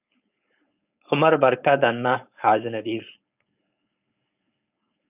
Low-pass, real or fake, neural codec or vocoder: 3.6 kHz; fake; codec, 16 kHz, 4.8 kbps, FACodec